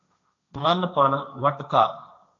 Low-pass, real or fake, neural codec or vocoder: 7.2 kHz; fake; codec, 16 kHz, 1.1 kbps, Voila-Tokenizer